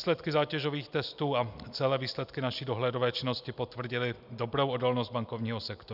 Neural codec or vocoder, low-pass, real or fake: none; 5.4 kHz; real